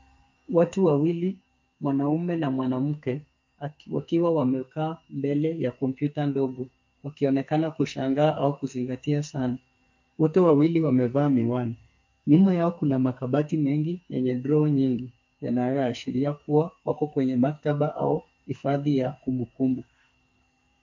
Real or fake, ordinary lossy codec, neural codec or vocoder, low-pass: fake; MP3, 48 kbps; codec, 44.1 kHz, 2.6 kbps, SNAC; 7.2 kHz